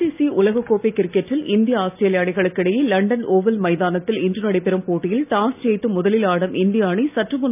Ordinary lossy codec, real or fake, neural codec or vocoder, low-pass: none; real; none; 3.6 kHz